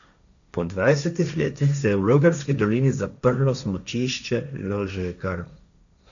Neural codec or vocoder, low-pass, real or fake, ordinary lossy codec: codec, 16 kHz, 1.1 kbps, Voila-Tokenizer; 7.2 kHz; fake; none